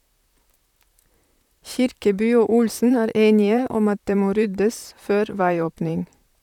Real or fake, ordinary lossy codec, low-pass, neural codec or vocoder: fake; none; 19.8 kHz; vocoder, 44.1 kHz, 128 mel bands, Pupu-Vocoder